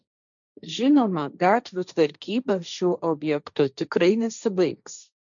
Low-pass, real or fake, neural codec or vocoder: 7.2 kHz; fake; codec, 16 kHz, 1.1 kbps, Voila-Tokenizer